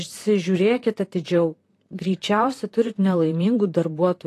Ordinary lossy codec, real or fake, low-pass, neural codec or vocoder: AAC, 48 kbps; fake; 14.4 kHz; vocoder, 44.1 kHz, 128 mel bands, Pupu-Vocoder